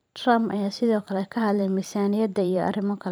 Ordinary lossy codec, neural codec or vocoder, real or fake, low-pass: none; vocoder, 44.1 kHz, 128 mel bands every 512 samples, BigVGAN v2; fake; none